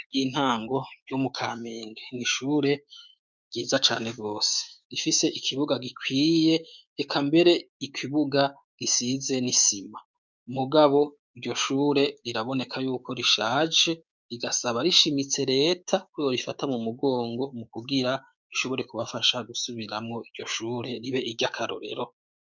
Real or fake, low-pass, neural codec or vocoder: fake; 7.2 kHz; codec, 16 kHz, 6 kbps, DAC